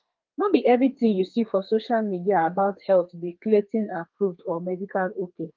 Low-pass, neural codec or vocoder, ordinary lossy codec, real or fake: 7.2 kHz; codec, 32 kHz, 1.9 kbps, SNAC; Opus, 32 kbps; fake